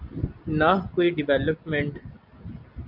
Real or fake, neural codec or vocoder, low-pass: real; none; 5.4 kHz